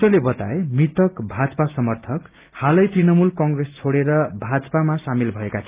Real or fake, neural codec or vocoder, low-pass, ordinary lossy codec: real; none; 3.6 kHz; Opus, 32 kbps